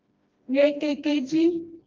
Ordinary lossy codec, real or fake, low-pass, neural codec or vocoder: Opus, 24 kbps; fake; 7.2 kHz; codec, 16 kHz, 1 kbps, FreqCodec, smaller model